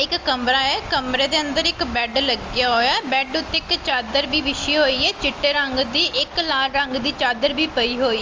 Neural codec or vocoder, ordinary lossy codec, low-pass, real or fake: none; Opus, 32 kbps; 7.2 kHz; real